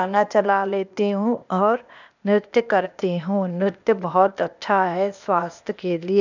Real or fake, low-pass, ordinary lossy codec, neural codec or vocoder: fake; 7.2 kHz; none; codec, 16 kHz, 0.8 kbps, ZipCodec